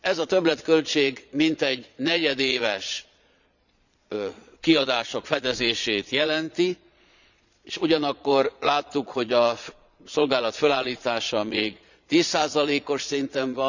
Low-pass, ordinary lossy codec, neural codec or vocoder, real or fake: 7.2 kHz; none; vocoder, 22.05 kHz, 80 mel bands, Vocos; fake